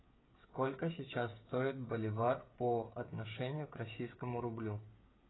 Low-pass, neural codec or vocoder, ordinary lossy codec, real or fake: 7.2 kHz; codec, 16 kHz, 8 kbps, FreqCodec, smaller model; AAC, 16 kbps; fake